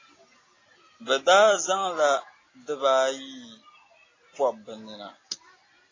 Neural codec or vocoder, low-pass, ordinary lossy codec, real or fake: none; 7.2 kHz; AAC, 32 kbps; real